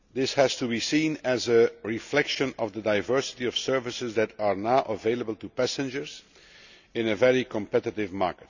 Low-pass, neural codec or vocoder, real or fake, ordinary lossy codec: 7.2 kHz; none; real; none